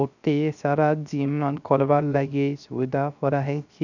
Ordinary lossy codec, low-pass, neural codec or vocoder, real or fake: none; 7.2 kHz; codec, 16 kHz, 0.3 kbps, FocalCodec; fake